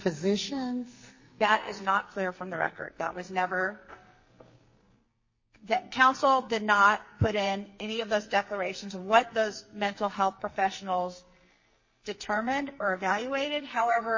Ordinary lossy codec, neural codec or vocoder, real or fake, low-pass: MP3, 32 kbps; codec, 44.1 kHz, 2.6 kbps, SNAC; fake; 7.2 kHz